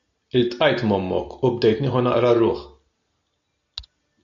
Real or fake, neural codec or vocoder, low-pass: real; none; 7.2 kHz